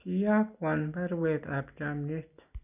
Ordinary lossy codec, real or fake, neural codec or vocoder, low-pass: none; real; none; 3.6 kHz